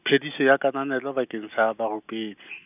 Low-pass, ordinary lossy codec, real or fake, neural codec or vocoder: 3.6 kHz; none; real; none